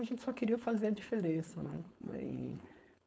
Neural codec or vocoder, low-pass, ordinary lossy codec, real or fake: codec, 16 kHz, 4.8 kbps, FACodec; none; none; fake